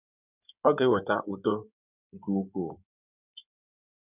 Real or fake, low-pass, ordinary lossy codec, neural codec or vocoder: fake; 3.6 kHz; none; codec, 16 kHz in and 24 kHz out, 2.2 kbps, FireRedTTS-2 codec